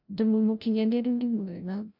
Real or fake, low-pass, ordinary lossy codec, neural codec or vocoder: fake; 5.4 kHz; none; codec, 16 kHz, 0.5 kbps, FreqCodec, larger model